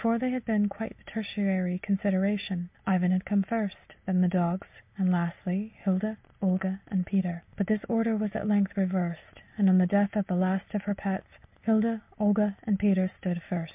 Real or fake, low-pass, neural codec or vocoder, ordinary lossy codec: real; 3.6 kHz; none; MP3, 32 kbps